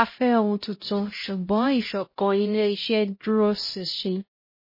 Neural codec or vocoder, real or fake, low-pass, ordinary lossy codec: codec, 16 kHz, 0.5 kbps, X-Codec, HuBERT features, trained on LibriSpeech; fake; 5.4 kHz; MP3, 24 kbps